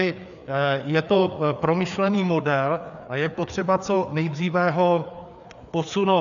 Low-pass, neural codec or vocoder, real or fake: 7.2 kHz; codec, 16 kHz, 4 kbps, FreqCodec, larger model; fake